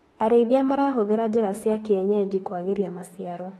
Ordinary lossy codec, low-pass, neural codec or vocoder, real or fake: AAC, 32 kbps; 19.8 kHz; autoencoder, 48 kHz, 32 numbers a frame, DAC-VAE, trained on Japanese speech; fake